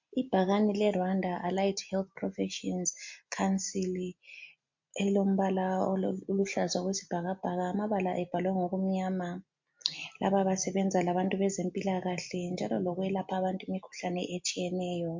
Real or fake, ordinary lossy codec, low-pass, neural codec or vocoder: real; MP3, 48 kbps; 7.2 kHz; none